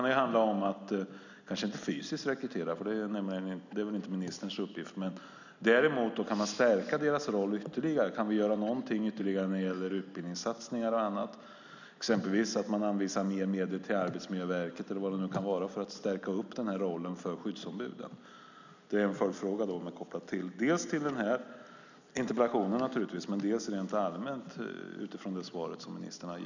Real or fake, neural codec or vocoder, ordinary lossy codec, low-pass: real; none; none; 7.2 kHz